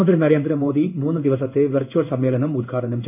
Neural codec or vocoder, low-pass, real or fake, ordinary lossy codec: codec, 16 kHz in and 24 kHz out, 1 kbps, XY-Tokenizer; 3.6 kHz; fake; none